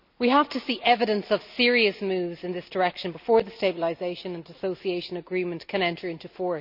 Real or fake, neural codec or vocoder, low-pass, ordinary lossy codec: real; none; 5.4 kHz; none